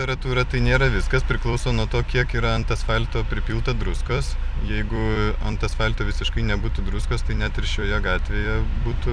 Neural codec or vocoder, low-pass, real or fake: vocoder, 44.1 kHz, 128 mel bands every 256 samples, BigVGAN v2; 9.9 kHz; fake